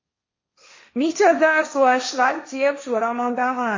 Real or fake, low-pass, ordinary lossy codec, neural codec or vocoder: fake; 7.2 kHz; MP3, 32 kbps; codec, 16 kHz, 1.1 kbps, Voila-Tokenizer